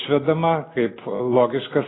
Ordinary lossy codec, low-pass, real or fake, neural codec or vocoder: AAC, 16 kbps; 7.2 kHz; real; none